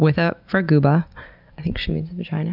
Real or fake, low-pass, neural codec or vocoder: real; 5.4 kHz; none